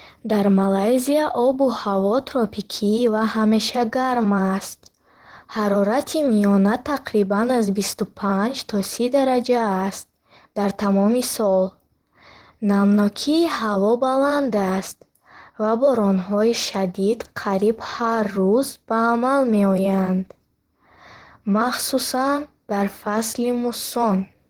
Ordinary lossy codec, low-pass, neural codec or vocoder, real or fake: Opus, 16 kbps; 19.8 kHz; vocoder, 44.1 kHz, 128 mel bands, Pupu-Vocoder; fake